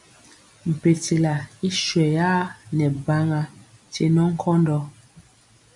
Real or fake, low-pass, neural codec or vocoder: real; 10.8 kHz; none